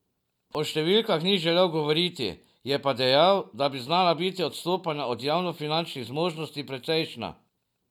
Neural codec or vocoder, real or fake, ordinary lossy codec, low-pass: none; real; none; 19.8 kHz